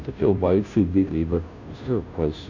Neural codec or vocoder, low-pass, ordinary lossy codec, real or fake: codec, 16 kHz, 0.5 kbps, FunCodec, trained on Chinese and English, 25 frames a second; 7.2 kHz; none; fake